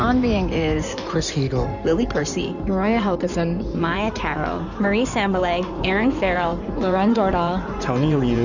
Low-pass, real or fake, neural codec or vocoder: 7.2 kHz; fake; codec, 16 kHz in and 24 kHz out, 2.2 kbps, FireRedTTS-2 codec